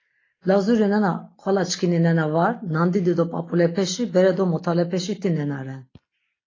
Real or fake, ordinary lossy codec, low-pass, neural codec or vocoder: real; AAC, 32 kbps; 7.2 kHz; none